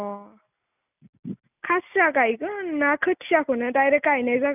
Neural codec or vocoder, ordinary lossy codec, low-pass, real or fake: none; none; 3.6 kHz; real